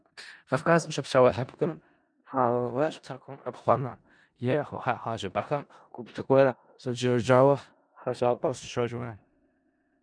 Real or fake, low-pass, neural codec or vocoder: fake; 9.9 kHz; codec, 16 kHz in and 24 kHz out, 0.4 kbps, LongCat-Audio-Codec, four codebook decoder